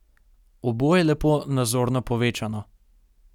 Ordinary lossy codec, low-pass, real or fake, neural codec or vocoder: none; 19.8 kHz; real; none